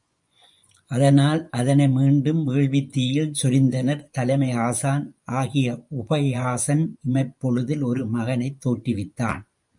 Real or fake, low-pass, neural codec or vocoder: fake; 10.8 kHz; vocoder, 24 kHz, 100 mel bands, Vocos